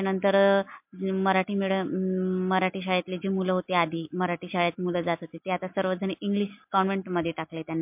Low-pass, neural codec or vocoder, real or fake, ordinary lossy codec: 3.6 kHz; none; real; MP3, 32 kbps